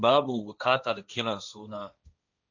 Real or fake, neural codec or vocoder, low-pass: fake; codec, 16 kHz, 1.1 kbps, Voila-Tokenizer; 7.2 kHz